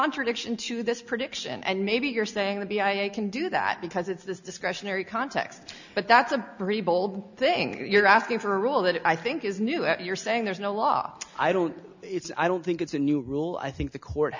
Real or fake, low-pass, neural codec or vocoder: real; 7.2 kHz; none